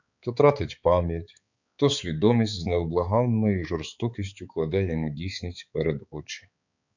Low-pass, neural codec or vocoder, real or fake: 7.2 kHz; codec, 16 kHz, 4 kbps, X-Codec, HuBERT features, trained on balanced general audio; fake